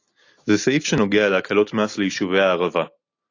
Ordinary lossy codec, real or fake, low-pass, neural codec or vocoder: AAC, 48 kbps; real; 7.2 kHz; none